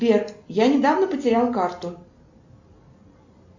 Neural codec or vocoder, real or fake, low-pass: none; real; 7.2 kHz